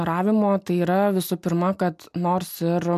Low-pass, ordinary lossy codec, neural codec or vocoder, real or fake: 14.4 kHz; MP3, 96 kbps; none; real